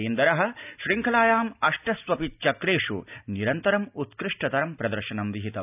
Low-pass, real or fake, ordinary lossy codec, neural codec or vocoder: 3.6 kHz; real; none; none